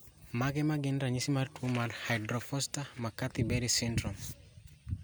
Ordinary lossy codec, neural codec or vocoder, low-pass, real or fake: none; none; none; real